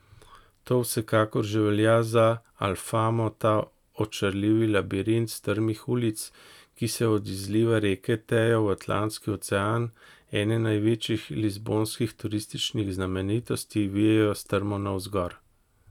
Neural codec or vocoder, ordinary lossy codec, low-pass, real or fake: none; none; 19.8 kHz; real